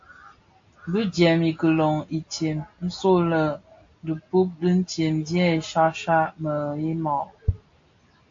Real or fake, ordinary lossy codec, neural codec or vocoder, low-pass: real; AAC, 48 kbps; none; 7.2 kHz